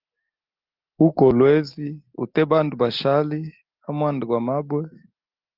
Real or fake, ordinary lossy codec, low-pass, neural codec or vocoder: real; Opus, 32 kbps; 5.4 kHz; none